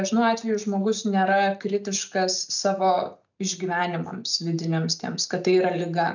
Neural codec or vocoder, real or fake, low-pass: none; real; 7.2 kHz